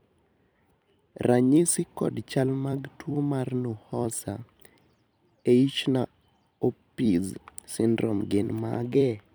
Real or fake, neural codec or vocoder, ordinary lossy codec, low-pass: fake; vocoder, 44.1 kHz, 128 mel bands every 256 samples, BigVGAN v2; none; none